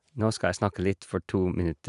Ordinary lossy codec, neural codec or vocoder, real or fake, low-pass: none; none; real; 10.8 kHz